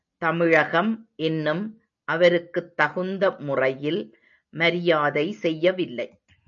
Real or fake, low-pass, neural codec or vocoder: real; 7.2 kHz; none